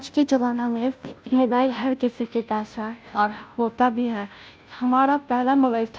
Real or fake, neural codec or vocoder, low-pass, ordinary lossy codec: fake; codec, 16 kHz, 0.5 kbps, FunCodec, trained on Chinese and English, 25 frames a second; none; none